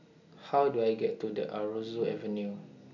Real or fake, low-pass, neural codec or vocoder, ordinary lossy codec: real; 7.2 kHz; none; none